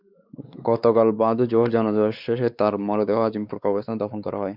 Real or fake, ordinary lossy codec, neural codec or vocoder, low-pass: fake; Opus, 64 kbps; codec, 16 kHz, 4 kbps, X-Codec, WavLM features, trained on Multilingual LibriSpeech; 5.4 kHz